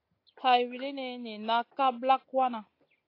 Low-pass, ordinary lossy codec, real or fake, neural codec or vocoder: 5.4 kHz; AAC, 32 kbps; real; none